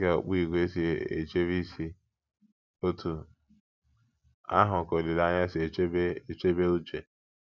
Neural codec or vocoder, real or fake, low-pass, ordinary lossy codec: none; real; 7.2 kHz; none